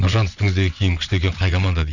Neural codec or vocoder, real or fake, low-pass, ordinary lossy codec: vocoder, 22.05 kHz, 80 mel bands, WaveNeXt; fake; 7.2 kHz; none